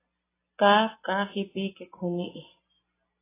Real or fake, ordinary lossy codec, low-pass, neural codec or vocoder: real; AAC, 16 kbps; 3.6 kHz; none